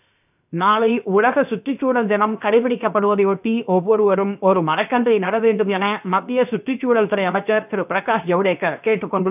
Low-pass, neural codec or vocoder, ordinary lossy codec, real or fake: 3.6 kHz; codec, 16 kHz, 0.7 kbps, FocalCodec; none; fake